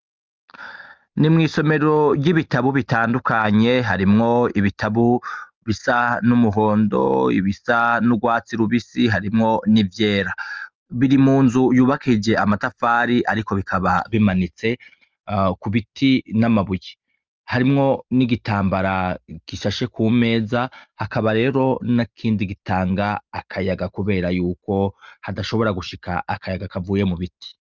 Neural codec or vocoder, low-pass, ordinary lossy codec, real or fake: none; 7.2 kHz; Opus, 24 kbps; real